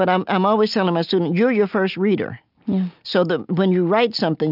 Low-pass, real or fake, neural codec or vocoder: 5.4 kHz; real; none